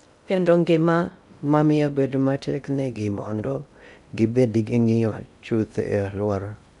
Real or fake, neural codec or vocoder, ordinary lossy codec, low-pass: fake; codec, 16 kHz in and 24 kHz out, 0.6 kbps, FocalCodec, streaming, 2048 codes; none; 10.8 kHz